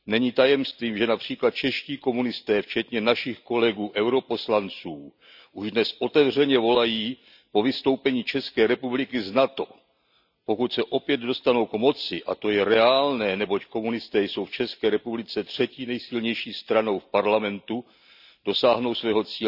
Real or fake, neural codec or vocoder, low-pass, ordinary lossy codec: real; none; 5.4 kHz; none